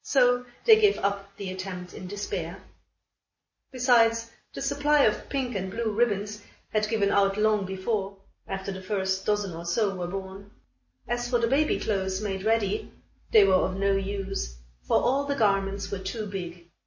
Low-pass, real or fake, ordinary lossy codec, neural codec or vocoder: 7.2 kHz; real; MP3, 32 kbps; none